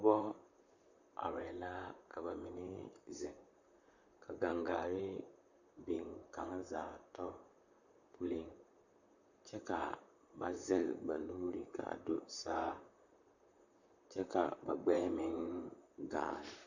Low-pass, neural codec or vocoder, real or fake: 7.2 kHz; vocoder, 44.1 kHz, 128 mel bands, Pupu-Vocoder; fake